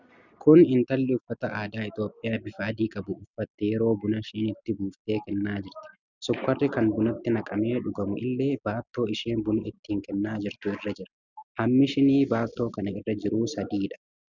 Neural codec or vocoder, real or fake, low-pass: none; real; 7.2 kHz